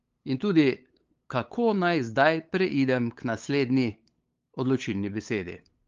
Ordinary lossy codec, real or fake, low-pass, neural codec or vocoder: Opus, 32 kbps; fake; 7.2 kHz; codec, 16 kHz, 8 kbps, FunCodec, trained on LibriTTS, 25 frames a second